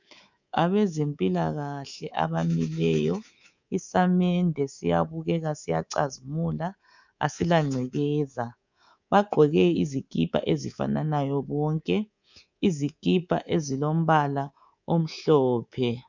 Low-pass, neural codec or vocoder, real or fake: 7.2 kHz; codec, 24 kHz, 3.1 kbps, DualCodec; fake